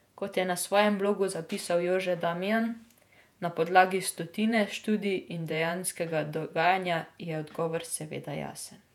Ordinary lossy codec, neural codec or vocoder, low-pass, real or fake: none; vocoder, 48 kHz, 128 mel bands, Vocos; 19.8 kHz; fake